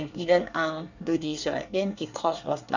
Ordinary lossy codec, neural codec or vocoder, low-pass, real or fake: none; codec, 24 kHz, 1 kbps, SNAC; 7.2 kHz; fake